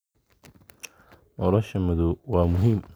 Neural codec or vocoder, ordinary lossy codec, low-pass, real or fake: none; none; none; real